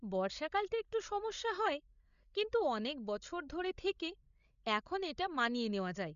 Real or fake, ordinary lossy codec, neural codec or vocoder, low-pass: fake; MP3, 96 kbps; codec, 16 kHz, 16 kbps, FreqCodec, larger model; 7.2 kHz